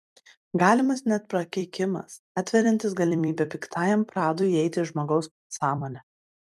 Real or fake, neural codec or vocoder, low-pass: fake; vocoder, 44.1 kHz, 128 mel bands every 256 samples, BigVGAN v2; 14.4 kHz